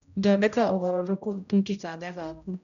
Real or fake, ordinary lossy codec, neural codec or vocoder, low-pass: fake; none; codec, 16 kHz, 0.5 kbps, X-Codec, HuBERT features, trained on general audio; 7.2 kHz